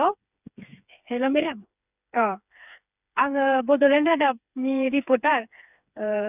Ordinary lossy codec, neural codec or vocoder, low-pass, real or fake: none; codec, 16 kHz, 4 kbps, FreqCodec, smaller model; 3.6 kHz; fake